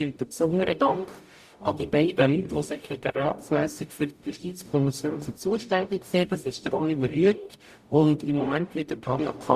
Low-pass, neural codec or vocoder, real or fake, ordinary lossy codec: 14.4 kHz; codec, 44.1 kHz, 0.9 kbps, DAC; fake; Opus, 64 kbps